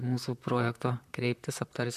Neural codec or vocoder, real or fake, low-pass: vocoder, 44.1 kHz, 128 mel bands, Pupu-Vocoder; fake; 14.4 kHz